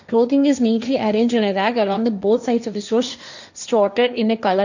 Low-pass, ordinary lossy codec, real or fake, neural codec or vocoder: 7.2 kHz; none; fake; codec, 16 kHz, 1.1 kbps, Voila-Tokenizer